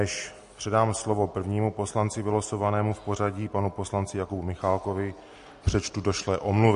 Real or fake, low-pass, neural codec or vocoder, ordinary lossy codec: real; 10.8 kHz; none; MP3, 48 kbps